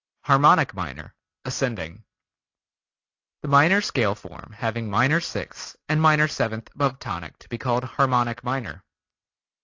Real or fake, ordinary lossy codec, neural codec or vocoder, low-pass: real; AAC, 48 kbps; none; 7.2 kHz